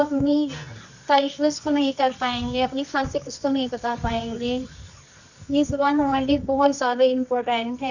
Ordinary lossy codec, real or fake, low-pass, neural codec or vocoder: none; fake; 7.2 kHz; codec, 24 kHz, 0.9 kbps, WavTokenizer, medium music audio release